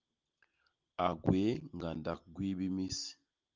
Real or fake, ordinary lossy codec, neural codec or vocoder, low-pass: real; Opus, 32 kbps; none; 7.2 kHz